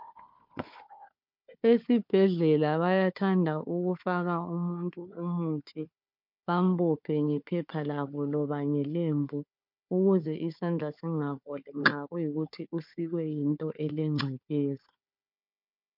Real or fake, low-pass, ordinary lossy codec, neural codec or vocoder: fake; 5.4 kHz; MP3, 48 kbps; codec, 16 kHz, 4 kbps, FunCodec, trained on Chinese and English, 50 frames a second